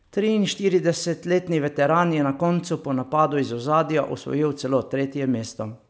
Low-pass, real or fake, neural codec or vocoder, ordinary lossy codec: none; real; none; none